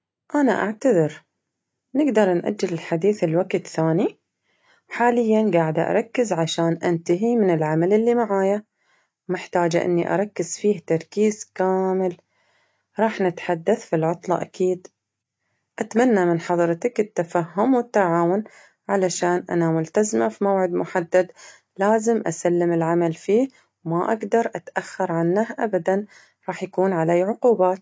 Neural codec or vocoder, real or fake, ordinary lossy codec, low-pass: none; real; none; none